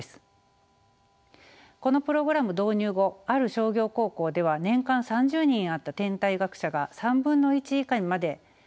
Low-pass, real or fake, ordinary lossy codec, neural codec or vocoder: none; real; none; none